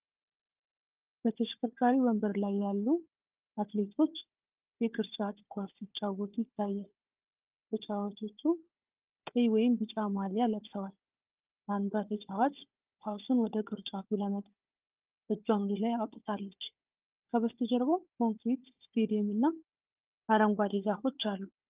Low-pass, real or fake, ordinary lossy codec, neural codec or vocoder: 3.6 kHz; fake; Opus, 32 kbps; codec, 16 kHz, 4 kbps, FunCodec, trained on Chinese and English, 50 frames a second